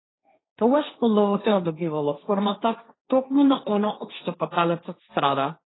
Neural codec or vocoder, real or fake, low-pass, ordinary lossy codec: codec, 16 kHz, 1.1 kbps, Voila-Tokenizer; fake; 7.2 kHz; AAC, 16 kbps